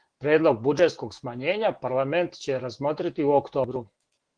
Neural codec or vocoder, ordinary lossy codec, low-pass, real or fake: autoencoder, 48 kHz, 128 numbers a frame, DAC-VAE, trained on Japanese speech; Opus, 16 kbps; 9.9 kHz; fake